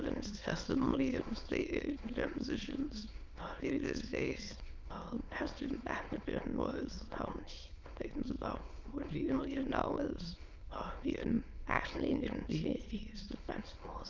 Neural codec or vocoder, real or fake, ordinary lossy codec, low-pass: autoencoder, 22.05 kHz, a latent of 192 numbers a frame, VITS, trained on many speakers; fake; Opus, 24 kbps; 7.2 kHz